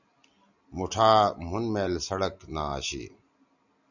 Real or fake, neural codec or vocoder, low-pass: real; none; 7.2 kHz